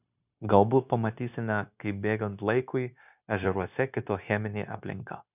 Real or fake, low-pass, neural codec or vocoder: fake; 3.6 kHz; codec, 16 kHz, 0.9 kbps, LongCat-Audio-Codec